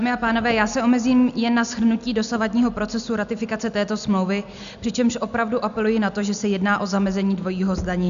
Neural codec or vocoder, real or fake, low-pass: none; real; 7.2 kHz